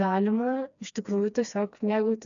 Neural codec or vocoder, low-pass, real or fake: codec, 16 kHz, 2 kbps, FreqCodec, smaller model; 7.2 kHz; fake